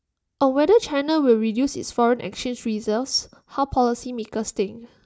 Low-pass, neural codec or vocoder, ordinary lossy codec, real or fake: none; none; none; real